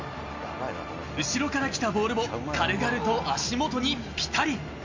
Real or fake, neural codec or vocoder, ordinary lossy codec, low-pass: real; none; MP3, 64 kbps; 7.2 kHz